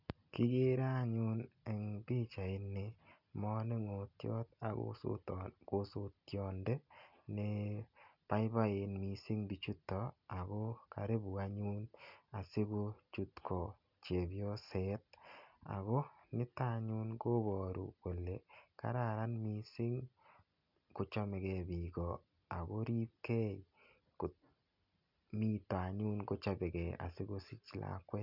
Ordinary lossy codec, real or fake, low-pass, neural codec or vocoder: none; real; 5.4 kHz; none